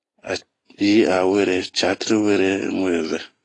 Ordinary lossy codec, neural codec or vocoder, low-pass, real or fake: AAC, 32 kbps; none; 10.8 kHz; real